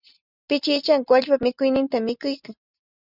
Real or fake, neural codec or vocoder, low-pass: real; none; 5.4 kHz